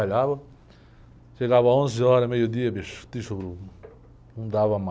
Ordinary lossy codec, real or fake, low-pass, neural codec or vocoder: none; real; none; none